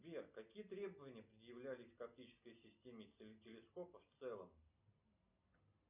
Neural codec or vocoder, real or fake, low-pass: none; real; 3.6 kHz